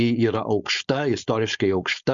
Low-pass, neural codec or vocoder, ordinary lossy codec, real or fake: 7.2 kHz; codec, 16 kHz, 4.8 kbps, FACodec; Opus, 64 kbps; fake